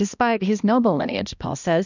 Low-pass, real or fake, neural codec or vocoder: 7.2 kHz; fake; codec, 16 kHz, 1 kbps, X-Codec, HuBERT features, trained on balanced general audio